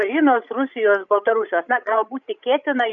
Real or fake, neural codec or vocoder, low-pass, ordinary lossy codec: fake; codec, 16 kHz, 16 kbps, FreqCodec, larger model; 7.2 kHz; MP3, 64 kbps